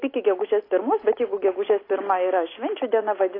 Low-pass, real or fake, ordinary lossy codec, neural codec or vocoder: 5.4 kHz; real; AAC, 24 kbps; none